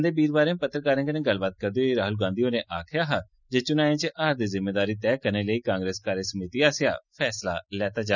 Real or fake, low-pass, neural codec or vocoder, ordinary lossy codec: real; 7.2 kHz; none; none